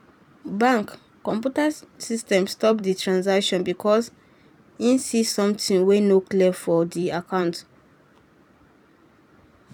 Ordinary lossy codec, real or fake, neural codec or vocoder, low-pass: none; fake; vocoder, 44.1 kHz, 128 mel bands every 256 samples, BigVGAN v2; 19.8 kHz